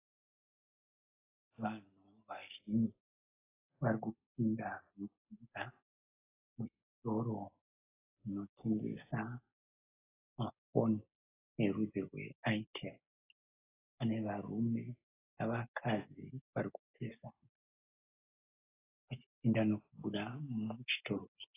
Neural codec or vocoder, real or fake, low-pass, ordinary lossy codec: none; real; 3.6 kHz; AAC, 16 kbps